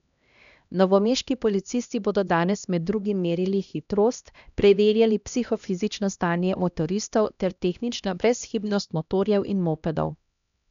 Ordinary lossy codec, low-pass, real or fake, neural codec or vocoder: none; 7.2 kHz; fake; codec, 16 kHz, 1 kbps, X-Codec, HuBERT features, trained on LibriSpeech